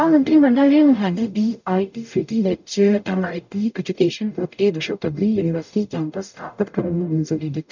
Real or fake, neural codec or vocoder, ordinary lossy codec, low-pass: fake; codec, 44.1 kHz, 0.9 kbps, DAC; none; 7.2 kHz